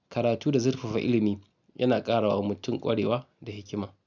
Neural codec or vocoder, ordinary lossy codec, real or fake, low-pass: none; none; real; 7.2 kHz